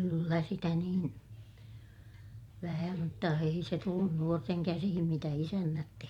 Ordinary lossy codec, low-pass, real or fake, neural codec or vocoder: MP3, 96 kbps; 19.8 kHz; fake; vocoder, 44.1 kHz, 128 mel bands every 512 samples, BigVGAN v2